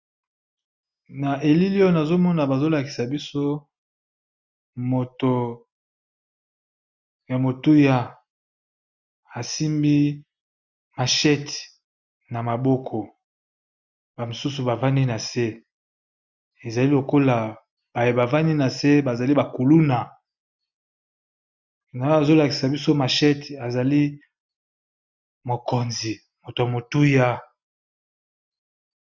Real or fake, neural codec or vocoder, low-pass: real; none; 7.2 kHz